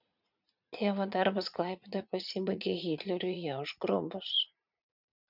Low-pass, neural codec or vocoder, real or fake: 5.4 kHz; vocoder, 44.1 kHz, 80 mel bands, Vocos; fake